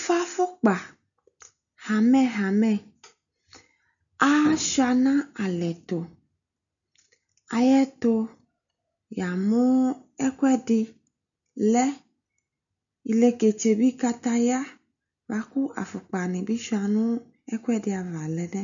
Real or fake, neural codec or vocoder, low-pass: real; none; 7.2 kHz